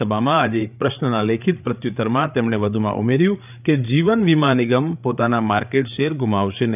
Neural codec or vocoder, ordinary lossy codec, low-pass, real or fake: codec, 16 kHz, 8 kbps, FreqCodec, larger model; none; 3.6 kHz; fake